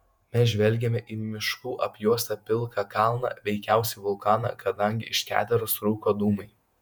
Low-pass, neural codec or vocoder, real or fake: 19.8 kHz; vocoder, 48 kHz, 128 mel bands, Vocos; fake